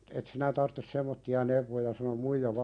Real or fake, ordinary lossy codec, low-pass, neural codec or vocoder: real; none; 9.9 kHz; none